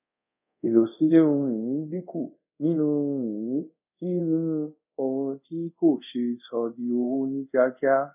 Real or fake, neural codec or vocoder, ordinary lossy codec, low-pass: fake; codec, 24 kHz, 0.5 kbps, DualCodec; none; 3.6 kHz